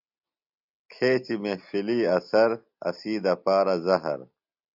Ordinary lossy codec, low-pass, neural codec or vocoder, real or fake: AAC, 48 kbps; 5.4 kHz; none; real